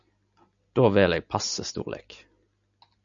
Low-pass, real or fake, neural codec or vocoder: 7.2 kHz; real; none